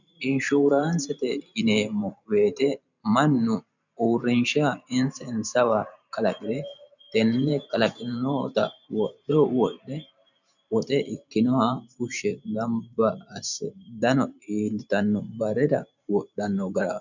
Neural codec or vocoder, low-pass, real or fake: vocoder, 44.1 kHz, 128 mel bands every 512 samples, BigVGAN v2; 7.2 kHz; fake